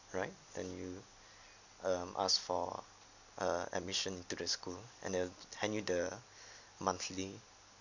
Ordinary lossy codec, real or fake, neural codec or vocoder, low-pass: none; real; none; 7.2 kHz